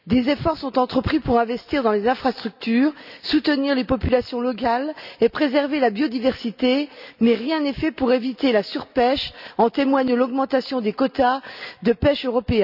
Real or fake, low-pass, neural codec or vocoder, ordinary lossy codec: real; 5.4 kHz; none; none